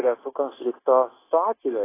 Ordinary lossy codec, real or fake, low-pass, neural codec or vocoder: AAC, 16 kbps; fake; 3.6 kHz; codec, 16 kHz, 0.9 kbps, LongCat-Audio-Codec